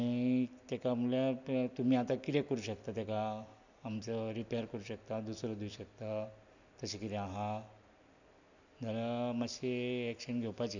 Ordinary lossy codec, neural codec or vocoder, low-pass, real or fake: none; none; 7.2 kHz; real